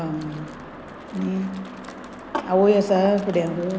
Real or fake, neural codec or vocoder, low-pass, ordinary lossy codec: real; none; none; none